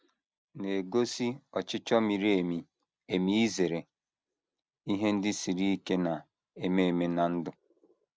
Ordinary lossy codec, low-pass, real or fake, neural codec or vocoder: none; none; real; none